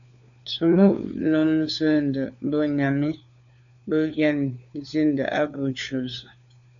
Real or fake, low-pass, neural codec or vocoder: fake; 7.2 kHz; codec, 16 kHz, 4 kbps, FunCodec, trained on LibriTTS, 50 frames a second